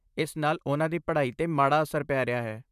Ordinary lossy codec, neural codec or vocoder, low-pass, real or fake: none; vocoder, 44.1 kHz, 128 mel bands, Pupu-Vocoder; 14.4 kHz; fake